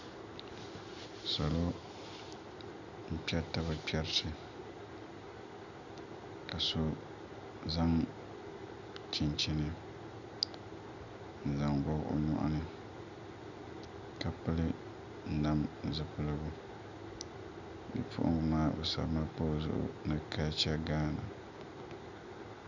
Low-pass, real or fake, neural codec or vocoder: 7.2 kHz; real; none